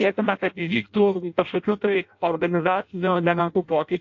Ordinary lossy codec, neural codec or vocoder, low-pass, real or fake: AAC, 48 kbps; codec, 16 kHz in and 24 kHz out, 0.6 kbps, FireRedTTS-2 codec; 7.2 kHz; fake